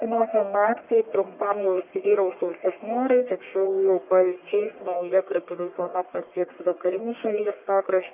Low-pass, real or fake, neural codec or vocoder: 3.6 kHz; fake; codec, 44.1 kHz, 1.7 kbps, Pupu-Codec